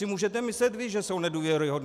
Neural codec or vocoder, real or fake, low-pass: none; real; 14.4 kHz